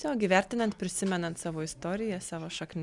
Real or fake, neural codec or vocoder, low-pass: real; none; 10.8 kHz